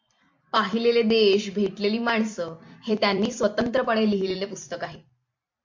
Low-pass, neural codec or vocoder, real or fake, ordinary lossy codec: 7.2 kHz; vocoder, 44.1 kHz, 128 mel bands every 256 samples, BigVGAN v2; fake; MP3, 48 kbps